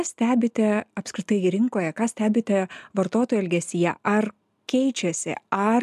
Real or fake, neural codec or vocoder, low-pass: real; none; 14.4 kHz